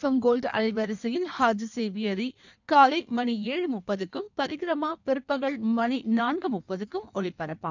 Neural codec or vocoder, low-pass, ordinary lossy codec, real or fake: codec, 16 kHz in and 24 kHz out, 1.1 kbps, FireRedTTS-2 codec; 7.2 kHz; none; fake